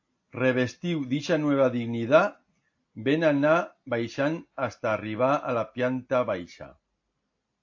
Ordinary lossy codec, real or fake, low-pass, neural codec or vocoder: AAC, 48 kbps; real; 7.2 kHz; none